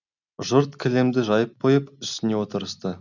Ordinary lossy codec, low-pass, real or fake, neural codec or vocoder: none; 7.2 kHz; real; none